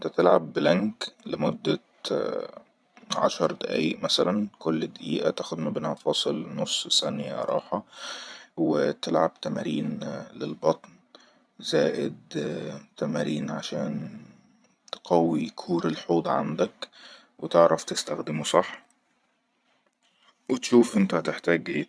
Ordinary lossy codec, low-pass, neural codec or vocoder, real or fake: none; none; vocoder, 22.05 kHz, 80 mel bands, Vocos; fake